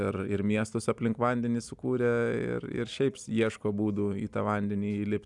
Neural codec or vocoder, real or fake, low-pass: none; real; 10.8 kHz